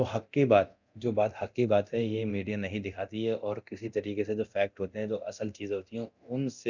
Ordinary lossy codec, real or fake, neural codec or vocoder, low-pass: none; fake; codec, 24 kHz, 0.9 kbps, DualCodec; 7.2 kHz